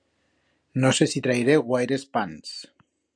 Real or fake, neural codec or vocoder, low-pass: real; none; 9.9 kHz